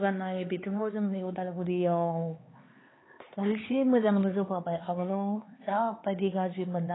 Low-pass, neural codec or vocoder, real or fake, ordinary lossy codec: 7.2 kHz; codec, 16 kHz, 4 kbps, X-Codec, HuBERT features, trained on LibriSpeech; fake; AAC, 16 kbps